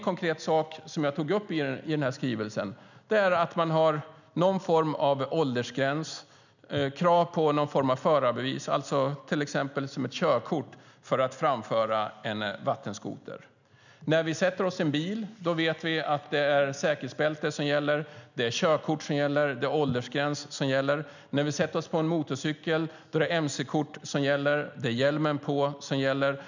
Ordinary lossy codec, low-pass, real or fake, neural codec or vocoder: none; 7.2 kHz; real; none